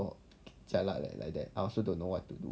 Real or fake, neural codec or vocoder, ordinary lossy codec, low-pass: real; none; none; none